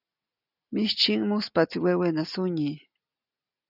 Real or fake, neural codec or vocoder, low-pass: real; none; 5.4 kHz